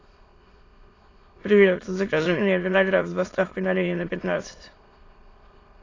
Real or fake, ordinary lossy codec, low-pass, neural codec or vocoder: fake; AAC, 32 kbps; 7.2 kHz; autoencoder, 22.05 kHz, a latent of 192 numbers a frame, VITS, trained on many speakers